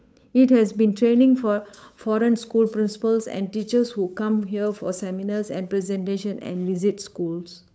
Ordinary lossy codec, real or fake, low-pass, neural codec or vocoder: none; fake; none; codec, 16 kHz, 8 kbps, FunCodec, trained on Chinese and English, 25 frames a second